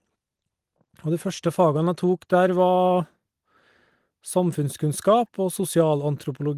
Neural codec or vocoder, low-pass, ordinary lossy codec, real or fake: none; 14.4 kHz; Opus, 24 kbps; real